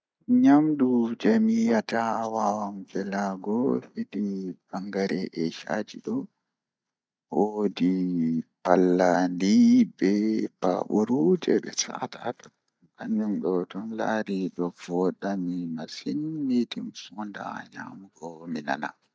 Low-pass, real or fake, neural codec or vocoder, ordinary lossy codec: none; real; none; none